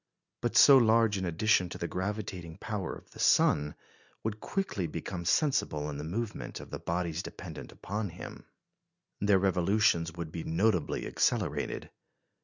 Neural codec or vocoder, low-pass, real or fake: none; 7.2 kHz; real